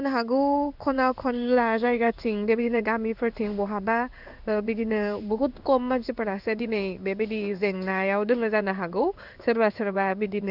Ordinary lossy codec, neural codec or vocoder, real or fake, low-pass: none; codec, 16 kHz in and 24 kHz out, 1 kbps, XY-Tokenizer; fake; 5.4 kHz